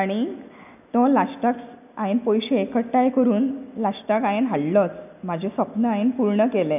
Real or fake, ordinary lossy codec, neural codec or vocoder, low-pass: real; none; none; 3.6 kHz